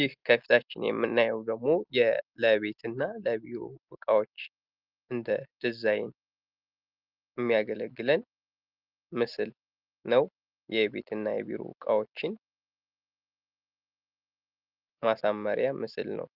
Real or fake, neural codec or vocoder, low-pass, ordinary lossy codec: real; none; 5.4 kHz; Opus, 32 kbps